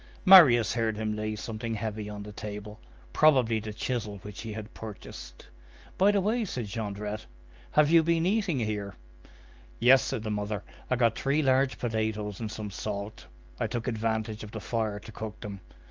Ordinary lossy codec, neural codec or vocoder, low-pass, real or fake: Opus, 24 kbps; none; 7.2 kHz; real